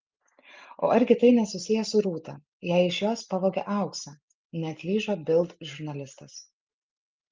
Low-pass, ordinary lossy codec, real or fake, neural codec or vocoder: 7.2 kHz; Opus, 24 kbps; real; none